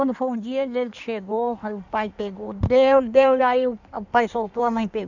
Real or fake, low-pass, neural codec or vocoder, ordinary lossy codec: fake; 7.2 kHz; codec, 16 kHz in and 24 kHz out, 1.1 kbps, FireRedTTS-2 codec; none